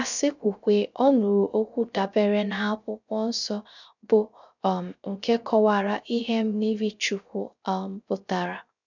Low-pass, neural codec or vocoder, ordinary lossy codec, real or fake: 7.2 kHz; codec, 16 kHz, 0.3 kbps, FocalCodec; none; fake